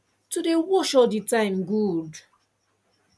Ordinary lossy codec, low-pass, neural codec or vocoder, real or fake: none; none; none; real